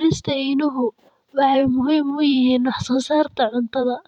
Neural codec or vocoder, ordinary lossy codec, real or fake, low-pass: vocoder, 44.1 kHz, 128 mel bands every 512 samples, BigVGAN v2; none; fake; 19.8 kHz